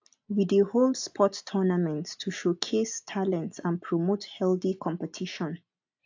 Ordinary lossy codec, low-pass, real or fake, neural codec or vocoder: AAC, 48 kbps; 7.2 kHz; real; none